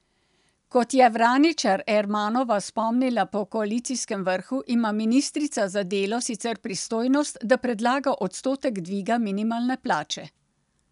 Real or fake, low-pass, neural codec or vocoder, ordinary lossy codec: real; 10.8 kHz; none; none